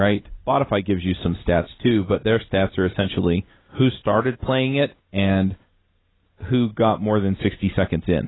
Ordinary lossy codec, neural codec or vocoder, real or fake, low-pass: AAC, 16 kbps; none; real; 7.2 kHz